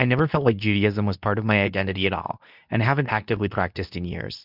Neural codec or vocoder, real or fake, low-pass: codec, 24 kHz, 0.9 kbps, WavTokenizer, medium speech release version 2; fake; 5.4 kHz